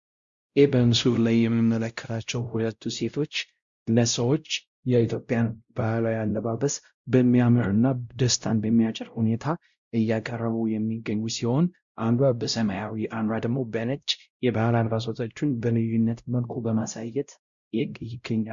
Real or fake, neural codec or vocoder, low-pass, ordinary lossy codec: fake; codec, 16 kHz, 0.5 kbps, X-Codec, WavLM features, trained on Multilingual LibriSpeech; 7.2 kHz; Opus, 64 kbps